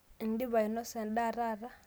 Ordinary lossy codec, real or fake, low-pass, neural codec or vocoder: none; real; none; none